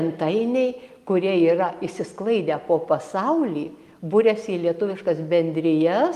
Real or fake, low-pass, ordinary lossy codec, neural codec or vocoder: real; 14.4 kHz; Opus, 32 kbps; none